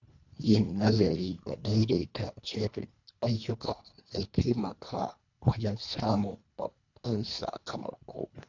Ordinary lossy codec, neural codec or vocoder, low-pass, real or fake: none; codec, 24 kHz, 1.5 kbps, HILCodec; 7.2 kHz; fake